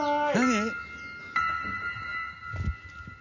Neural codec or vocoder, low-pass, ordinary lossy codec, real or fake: none; 7.2 kHz; none; real